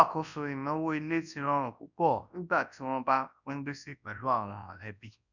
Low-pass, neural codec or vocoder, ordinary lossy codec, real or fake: 7.2 kHz; codec, 24 kHz, 0.9 kbps, WavTokenizer, large speech release; none; fake